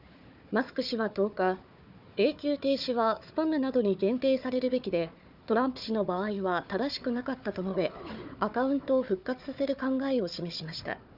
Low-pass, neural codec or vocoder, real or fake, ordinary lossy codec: 5.4 kHz; codec, 16 kHz, 4 kbps, FunCodec, trained on Chinese and English, 50 frames a second; fake; none